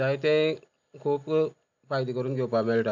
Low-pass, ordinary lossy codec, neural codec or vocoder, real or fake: 7.2 kHz; none; none; real